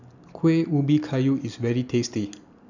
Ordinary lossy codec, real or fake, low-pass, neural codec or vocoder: none; real; 7.2 kHz; none